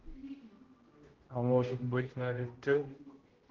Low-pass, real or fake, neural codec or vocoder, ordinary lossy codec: 7.2 kHz; fake; codec, 16 kHz, 0.5 kbps, X-Codec, HuBERT features, trained on general audio; Opus, 16 kbps